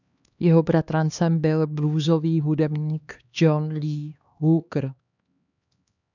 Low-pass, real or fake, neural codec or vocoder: 7.2 kHz; fake; codec, 16 kHz, 2 kbps, X-Codec, HuBERT features, trained on LibriSpeech